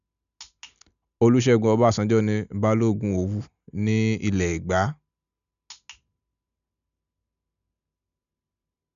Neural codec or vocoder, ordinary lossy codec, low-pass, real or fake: none; none; 7.2 kHz; real